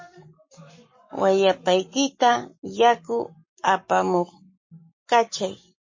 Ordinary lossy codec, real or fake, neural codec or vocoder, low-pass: MP3, 32 kbps; fake; codec, 44.1 kHz, 7.8 kbps, Pupu-Codec; 7.2 kHz